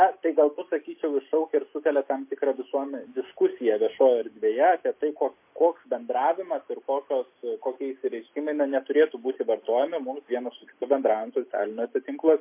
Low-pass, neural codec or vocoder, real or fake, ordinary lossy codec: 3.6 kHz; none; real; MP3, 24 kbps